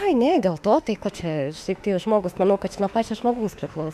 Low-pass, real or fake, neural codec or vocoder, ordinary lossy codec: 14.4 kHz; fake; autoencoder, 48 kHz, 32 numbers a frame, DAC-VAE, trained on Japanese speech; AAC, 96 kbps